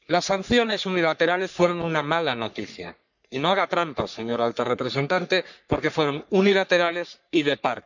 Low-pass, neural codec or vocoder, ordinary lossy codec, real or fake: 7.2 kHz; codec, 44.1 kHz, 3.4 kbps, Pupu-Codec; none; fake